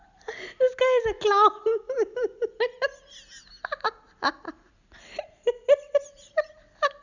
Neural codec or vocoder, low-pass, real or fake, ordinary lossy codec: none; 7.2 kHz; real; none